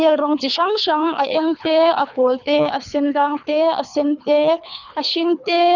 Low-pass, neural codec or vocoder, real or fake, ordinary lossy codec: 7.2 kHz; codec, 24 kHz, 3 kbps, HILCodec; fake; none